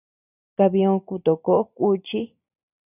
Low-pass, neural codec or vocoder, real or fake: 3.6 kHz; none; real